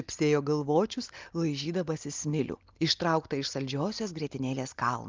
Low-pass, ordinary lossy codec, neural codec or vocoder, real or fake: 7.2 kHz; Opus, 24 kbps; none; real